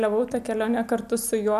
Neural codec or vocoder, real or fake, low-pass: none; real; 14.4 kHz